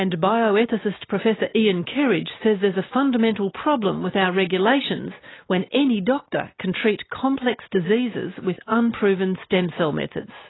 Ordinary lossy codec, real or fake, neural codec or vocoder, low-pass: AAC, 16 kbps; real; none; 7.2 kHz